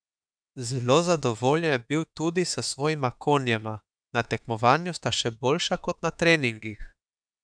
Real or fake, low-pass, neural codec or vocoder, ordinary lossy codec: fake; 9.9 kHz; autoencoder, 48 kHz, 32 numbers a frame, DAC-VAE, trained on Japanese speech; none